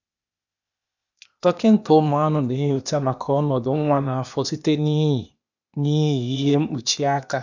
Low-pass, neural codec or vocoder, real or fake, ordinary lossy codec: 7.2 kHz; codec, 16 kHz, 0.8 kbps, ZipCodec; fake; none